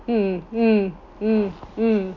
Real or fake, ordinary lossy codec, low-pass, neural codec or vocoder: real; none; 7.2 kHz; none